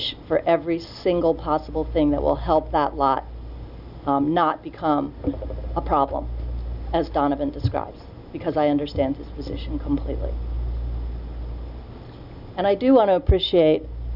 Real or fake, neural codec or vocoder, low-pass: real; none; 5.4 kHz